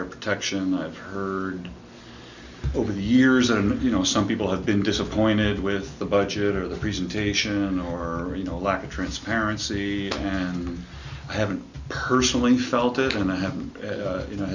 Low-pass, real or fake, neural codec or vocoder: 7.2 kHz; real; none